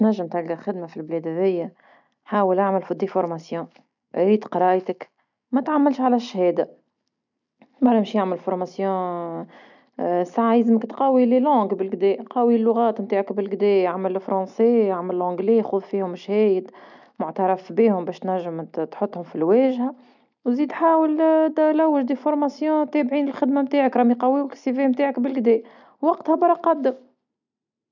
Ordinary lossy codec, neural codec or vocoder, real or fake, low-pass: none; none; real; 7.2 kHz